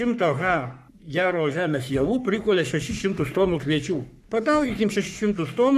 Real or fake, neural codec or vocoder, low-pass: fake; codec, 44.1 kHz, 3.4 kbps, Pupu-Codec; 14.4 kHz